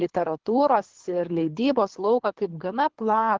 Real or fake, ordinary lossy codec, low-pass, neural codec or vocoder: fake; Opus, 16 kbps; 7.2 kHz; codec, 24 kHz, 3 kbps, HILCodec